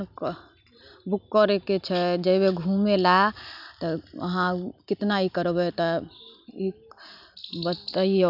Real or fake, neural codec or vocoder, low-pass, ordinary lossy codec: real; none; 5.4 kHz; none